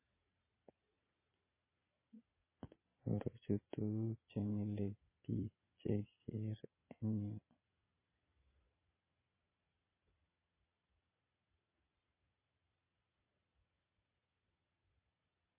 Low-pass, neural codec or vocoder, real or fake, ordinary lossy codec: 3.6 kHz; vocoder, 22.05 kHz, 80 mel bands, Vocos; fake; MP3, 16 kbps